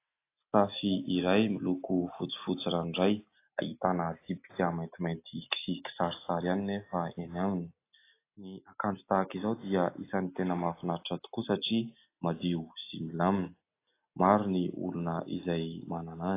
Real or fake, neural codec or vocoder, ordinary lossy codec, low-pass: real; none; AAC, 24 kbps; 3.6 kHz